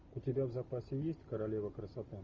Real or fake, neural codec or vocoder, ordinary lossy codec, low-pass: real; none; Opus, 32 kbps; 7.2 kHz